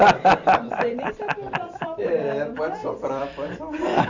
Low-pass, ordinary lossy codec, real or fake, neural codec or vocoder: 7.2 kHz; none; fake; vocoder, 44.1 kHz, 128 mel bands every 256 samples, BigVGAN v2